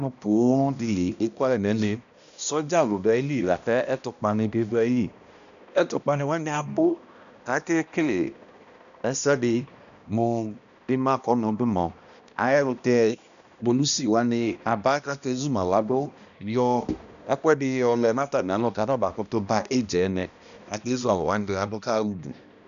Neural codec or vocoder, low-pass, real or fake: codec, 16 kHz, 1 kbps, X-Codec, HuBERT features, trained on balanced general audio; 7.2 kHz; fake